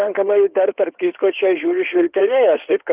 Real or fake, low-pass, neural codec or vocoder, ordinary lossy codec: fake; 3.6 kHz; codec, 16 kHz in and 24 kHz out, 2.2 kbps, FireRedTTS-2 codec; Opus, 32 kbps